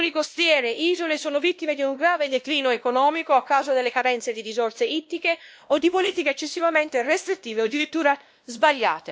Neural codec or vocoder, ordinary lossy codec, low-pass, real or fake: codec, 16 kHz, 1 kbps, X-Codec, WavLM features, trained on Multilingual LibriSpeech; none; none; fake